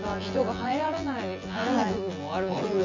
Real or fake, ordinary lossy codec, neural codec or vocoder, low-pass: fake; MP3, 48 kbps; vocoder, 24 kHz, 100 mel bands, Vocos; 7.2 kHz